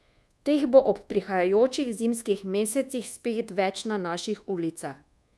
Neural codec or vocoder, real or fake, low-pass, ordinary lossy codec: codec, 24 kHz, 1.2 kbps, DualCodec; fake; none; none